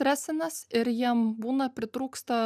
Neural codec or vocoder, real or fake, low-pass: none; real; 14.4 kHz